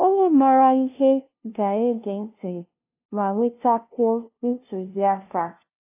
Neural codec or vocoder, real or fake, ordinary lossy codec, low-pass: codec, 16 kHz, 0.5 kbps, FunCodec, trained on LibriTTS, 25 frames a second; fake; AAC, 32 kbps; 3.6 kHz